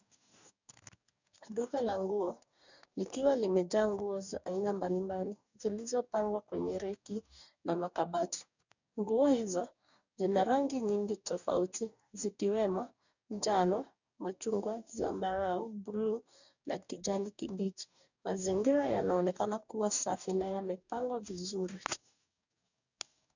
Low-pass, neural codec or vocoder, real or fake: 7.2 kHz; codec, 44.1 kHz, 2.6 kbps, DAC; fake